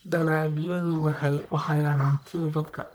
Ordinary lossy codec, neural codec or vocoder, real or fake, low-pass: none; codec, 44.1 kHz, 1.7 kbps, Pupu-Codec; fake; none